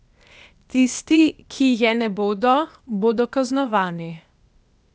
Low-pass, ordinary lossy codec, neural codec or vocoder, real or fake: none; none; codec, 16 kHz, 0.8 kbps, ZipCodec; fake